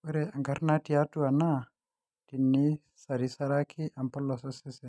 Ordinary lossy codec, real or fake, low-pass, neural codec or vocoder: none; real; none; none